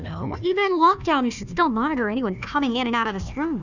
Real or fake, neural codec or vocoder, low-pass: fake; codec, 16 kHz, 1 kbps, FunCodec, trained on Chinese and English, 50 frames a second; 7.2 kHz